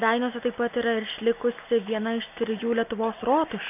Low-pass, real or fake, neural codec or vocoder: 3.6 kHz; real; none